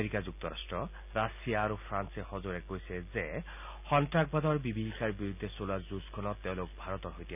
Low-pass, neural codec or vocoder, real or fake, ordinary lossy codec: 3.6 kHz; none; real; none